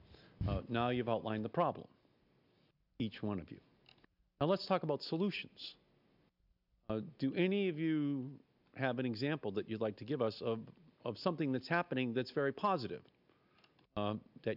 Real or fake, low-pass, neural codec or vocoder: real; 5.4 kHz; none